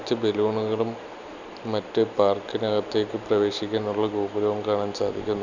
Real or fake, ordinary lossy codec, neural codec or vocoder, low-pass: real; none; none; 7.2 kHz